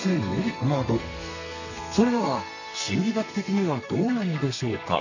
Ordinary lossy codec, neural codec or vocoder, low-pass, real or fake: none; codec, 32 kHz, 1.9 kbps, SNAC; 7.2 kHz; fake